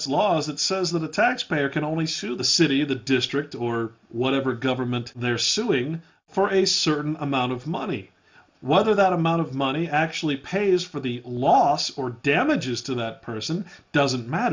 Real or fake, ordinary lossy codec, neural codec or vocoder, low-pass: real; MP3, 64 kbps; none; 7.2 kHz